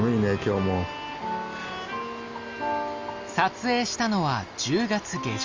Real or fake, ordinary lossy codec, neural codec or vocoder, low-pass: real; Opus, 32 kbps; none; 7.2 kHz